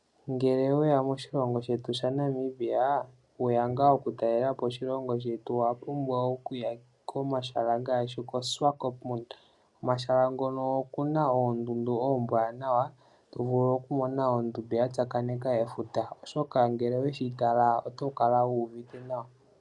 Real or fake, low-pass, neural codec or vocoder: real; 10.8 kHz; none